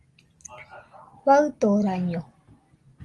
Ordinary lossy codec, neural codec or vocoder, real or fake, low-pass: Opus, 24 kbps; none; real; 10.8 kHz